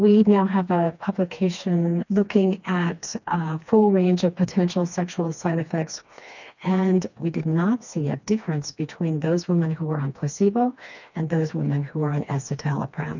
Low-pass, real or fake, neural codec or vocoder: 7.2 kHz; fake; codec, 16 kHz, 2 kbps, FreqCodec, smaller model